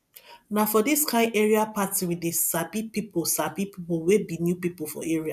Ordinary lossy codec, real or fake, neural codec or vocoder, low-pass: none; real; none; 14.4 kHz